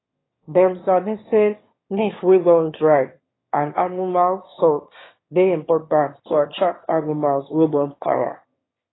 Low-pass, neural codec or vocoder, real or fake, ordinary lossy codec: 7.2 kHz; autoencoder, 22.05 kHz, a latent of 192 numbers a frame, VITS, trained on one speaker; fake; AAC, 16 kbps